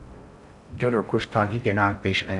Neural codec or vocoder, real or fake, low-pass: codec, 16 kHz in and 24 kHz out, 0.6 kbps, FocalCodec, streaming, 4096 codes; fake; 10.8 kHz